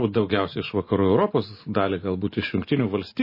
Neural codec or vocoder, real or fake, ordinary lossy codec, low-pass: none; real; MP3, 24 kbps; 5.4 kHz